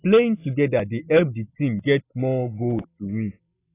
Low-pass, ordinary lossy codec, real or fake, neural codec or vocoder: 3.6 kHz; AAC, 16 kbps; real; none